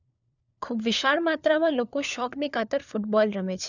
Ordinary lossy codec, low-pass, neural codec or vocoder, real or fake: none; 7.2 kHz; codec, 16 kHz, 4 kbps, FunCodec, trained on LibriTTS, 50 frames a second; fake